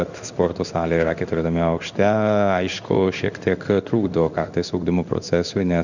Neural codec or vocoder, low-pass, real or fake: codec, 16 kHz in and 24 kHz out, 1 kbps, XY-Tokenizer; 7.2 kHz; fake